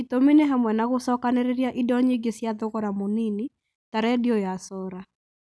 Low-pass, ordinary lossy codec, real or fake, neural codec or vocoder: none; none; real; none